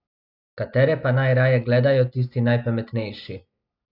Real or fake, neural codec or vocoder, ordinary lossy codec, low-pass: real; none; none; 5.4 kHz